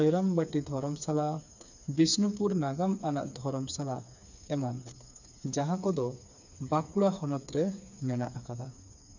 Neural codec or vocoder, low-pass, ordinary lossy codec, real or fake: codec, 16 kHz, 4 kbps, FreqCodec, smaller model; 7.2 kHz; none; fake